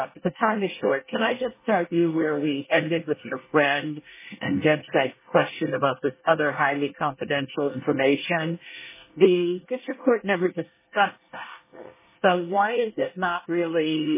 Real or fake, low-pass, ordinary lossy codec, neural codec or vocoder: fake; 3.6 kHz; MP3, 16 kbps; codec, 24 kHz, 1 kbps, SNAC